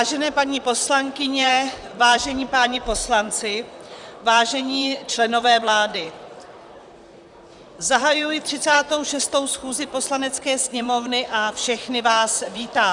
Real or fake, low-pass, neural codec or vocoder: fake; 10.8 kHz; vocoder, 44.1 kHz, 128 mel bands, Pupu-Vocoder